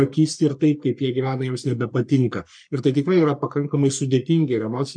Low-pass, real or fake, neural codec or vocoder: 9.9 kHz; fake; codec, 44.1 kHz, 3.4 kbps, Pupu-Codec